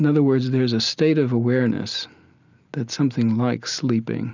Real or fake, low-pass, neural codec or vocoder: real; 7.2 kHz; none